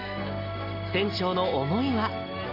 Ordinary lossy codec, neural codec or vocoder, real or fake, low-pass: AAC, 32 kbps; none; real; 5.4 kHz